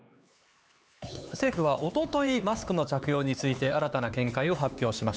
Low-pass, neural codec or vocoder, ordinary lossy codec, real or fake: none; codec, 16 kHz, 4 kbps, X-Codec, HuBERT features, trained on LibriSpeech; none; fake